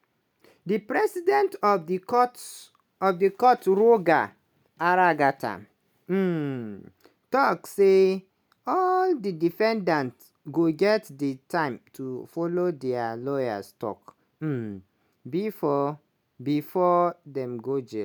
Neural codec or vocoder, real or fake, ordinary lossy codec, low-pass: none; real; none; none